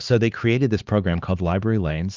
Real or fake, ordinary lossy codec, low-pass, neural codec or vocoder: fake; Opus, 24 kbps; 7.2 kHz; codec, 16 kHz, 4 kbps, X-Codec, HuBERT features, trained on LibriSpeech